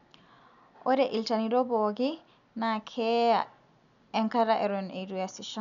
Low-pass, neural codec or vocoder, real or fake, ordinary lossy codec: 7.2 kHz; none; real; none